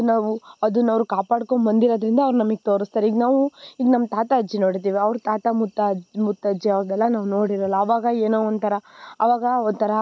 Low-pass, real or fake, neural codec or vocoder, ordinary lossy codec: none; real; none; none